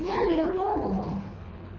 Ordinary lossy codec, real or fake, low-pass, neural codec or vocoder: none; fake; 7.2 kHz; codec, 24 kHz, 3 kbps, HILCodec